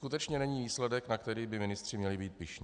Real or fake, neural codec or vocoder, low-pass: real; none; 9.9 kHz